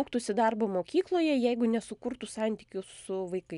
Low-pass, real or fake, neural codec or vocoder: 10.8 kHz; real; none